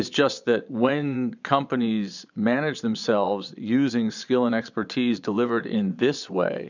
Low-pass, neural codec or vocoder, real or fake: 7.2 kHz; vocoder, 22.05 kHz, 80 mel bands, Vocos; fake